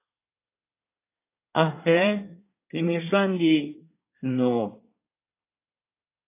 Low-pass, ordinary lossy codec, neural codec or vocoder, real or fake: 3.6 kHz; AAC, 24 kbps; codec, 24 kHz, 1 kbps, SNAC; fake